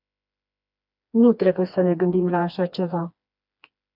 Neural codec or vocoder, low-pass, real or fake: codec, 16 kHz, 2 kbps, FreqCodec, smaller model; 5.4 kHz; fake